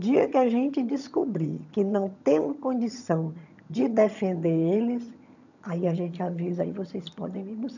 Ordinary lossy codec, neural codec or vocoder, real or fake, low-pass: none; vocoder, 22.05 kHz, 80 mel bands, HiFi-GAN; fake; 7.2 kHz